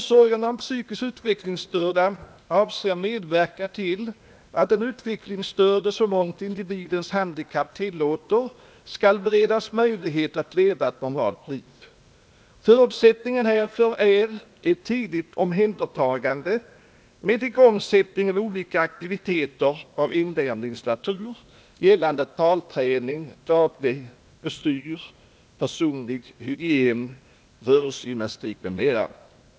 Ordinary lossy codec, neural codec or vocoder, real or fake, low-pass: none; codec, 16 kHz, 0.8 kbps, ZipCodec; fake; none